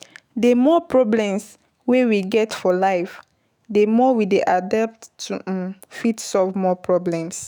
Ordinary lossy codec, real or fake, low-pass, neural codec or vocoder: none; fake; none; autoencoder, 48 kHz, 128 numbers a frame, DAC-VAE, trained on Japanese speech